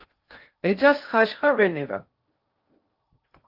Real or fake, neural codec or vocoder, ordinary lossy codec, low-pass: fake; codec, 16 kHz in and 24 kHz out, 0.6 kbps, FocalCodec, streaming, 2048 codes; Opus, 32 kbps; 5.4 kHz